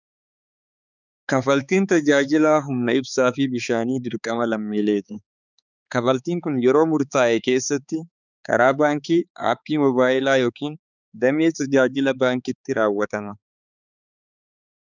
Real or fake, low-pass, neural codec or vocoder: fake; 7.2 kHz; codec, 16 kHz, 4 kbps, X-Codec, HuBERT features, trained on balanced general audio